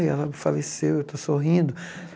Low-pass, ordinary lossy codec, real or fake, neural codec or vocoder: none; none; real; none